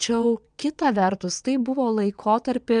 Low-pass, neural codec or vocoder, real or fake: 9.9 kHz; vocoder, 22.05 kHz, 80 mel bands, WaveNeXt; fake